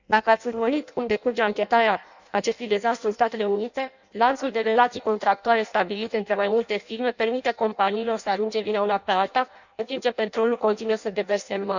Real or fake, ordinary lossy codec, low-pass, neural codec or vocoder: fake; none; 7.2 kHz; codec, 16 kHz in and 24 kHz out, 0.6 kbps, FireRedTTS-2 codec